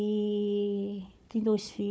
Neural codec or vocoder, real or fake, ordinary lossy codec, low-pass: codec, 16 kHz, 4 kbps, FunCodec, trained on Chinese and English, 50 frames a second; fake; none; none